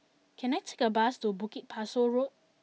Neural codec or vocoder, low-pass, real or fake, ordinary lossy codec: none; none; real; none